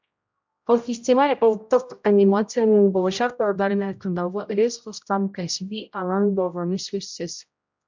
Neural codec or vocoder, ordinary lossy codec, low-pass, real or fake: codec, 16 kHz, 0.5 kbps, X-Codec, HuBERT features, trained on general audio; MP3, 64 kbps; 7.2 kHz; fake